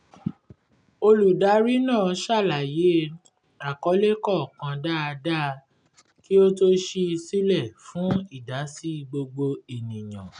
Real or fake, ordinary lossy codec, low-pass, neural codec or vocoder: real; none; 9.9 kHz; none